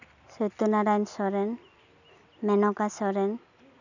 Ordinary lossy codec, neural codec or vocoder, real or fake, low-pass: none; none; real; 7.2 kHz